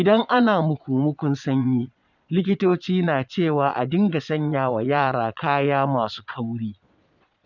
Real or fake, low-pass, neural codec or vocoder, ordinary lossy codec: fake; 7.2 kHz; vocoder, 22.05 kHz, 80 mel bands, Vocos; none